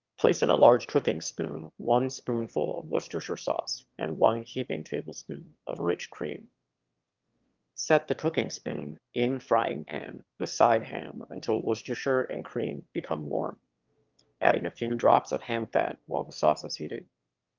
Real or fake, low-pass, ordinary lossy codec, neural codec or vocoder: fake; 7.2 kHz; Opus, 24 kbps; autoencoder, 22.05 kHz, a latent of 192 numbers a frame, VITS, trained on one speaker